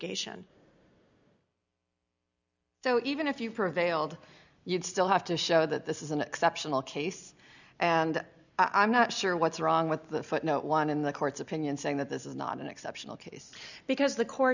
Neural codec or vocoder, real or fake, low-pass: none; real; 7.2 kHz